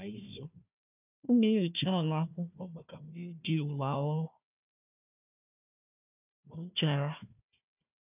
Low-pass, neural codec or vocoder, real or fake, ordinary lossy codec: 3.6 kHz; codec, 16 kHz, 1 kbps, FunCodec, trained on Chinese and English, 50 frames a second; fake; none